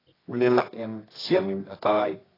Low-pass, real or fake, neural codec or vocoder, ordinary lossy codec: 5.4 kHz; fake; codec, 24 kHz, 0.9 kbps, WavTokenizer, medium music audio release; AAC, 24 kbps